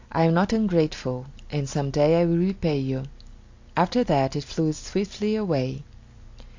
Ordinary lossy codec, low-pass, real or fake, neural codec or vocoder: AAC, 48 kbps; 7.2 kHz; real; none